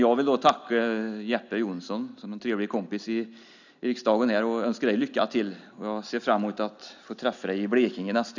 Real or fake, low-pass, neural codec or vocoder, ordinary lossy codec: real; 7.2 kHz; none; none